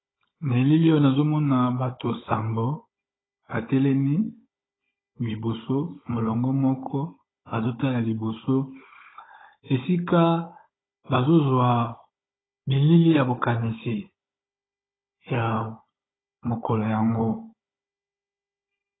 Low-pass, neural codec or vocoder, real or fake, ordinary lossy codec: 7.2 kHz; codec, 16 kHz, 16 kbps, FunCodec, trained on Chinese and English, 50 frames a second; fake; AAC, 16 kbps